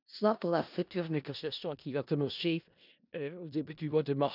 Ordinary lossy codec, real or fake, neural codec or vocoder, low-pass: none; fake; codec, 16 kHz in and 24 kHz out, 0.4 kbps, LongCat-Audio-Codec, four codebook decoder; 5.4 kHz